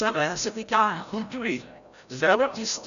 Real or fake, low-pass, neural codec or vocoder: fake; 7.2 kHz; codec, 16 kHz, 0.5 kbps, FreqCodec, larger model